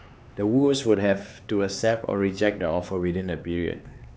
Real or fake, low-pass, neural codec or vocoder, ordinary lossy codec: fake; none; codec, 16 kHz, 4 kbps, X-Codec, HuBERT features, trained on LibriSpeech; none